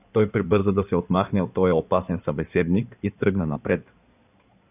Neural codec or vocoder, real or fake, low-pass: codec, 16 kHz in and 24 kHz out, 2.2 kbps, FireRedTTS-2 codec; fake; 3.6 kHz